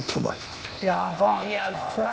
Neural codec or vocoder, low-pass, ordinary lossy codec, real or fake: codec, 16 kHz, 0.8 kbps, ZipCodec; none; none; fake